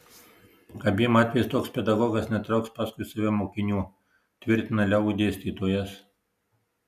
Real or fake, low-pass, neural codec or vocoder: real; 14.4 kHz; none